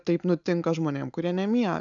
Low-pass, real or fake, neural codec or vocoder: 7.2 kHz; real; none